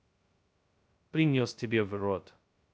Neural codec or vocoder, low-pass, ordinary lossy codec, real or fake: codec, 16 kHz, 0.2 kbps, FocalCodec; none; none; fake